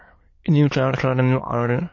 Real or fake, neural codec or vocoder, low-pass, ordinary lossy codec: fake; autoencoder, 22.05 kHz, a latent of 192 numbers a frame, VITS, trained on many speakers; 7.2 kHz; MP3, 32 kbps